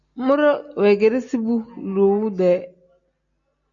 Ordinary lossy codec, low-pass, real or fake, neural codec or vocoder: AAC, 64 kbps; 7.2 kHz; real; none